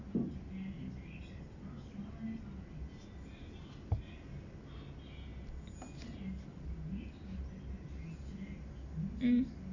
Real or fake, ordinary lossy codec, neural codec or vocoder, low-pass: real; Opus, 64 kbps; none; 7.2 kHz